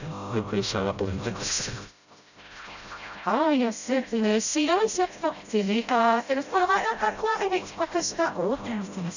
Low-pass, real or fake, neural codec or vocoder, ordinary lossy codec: 7.2 kHz; fake; codec, 16 kHz, 0.5 kbps, FreqCodec, smaller model; none